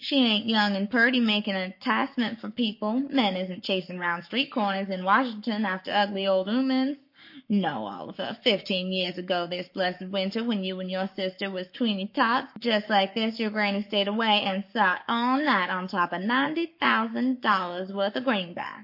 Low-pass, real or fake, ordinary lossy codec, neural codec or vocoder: 5.4 kHz; fake; MP3, 32 kbps; codec, 44.1 kHz, 7.8 kbps, Pupu-Codec